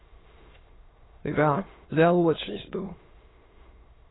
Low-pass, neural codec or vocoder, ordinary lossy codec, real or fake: 7.2 kHz; autoencoder, 22.05 kHz, a latent of 192 numbers a frame, VITS, trained on many speakers; AAC, 16 kbps; fake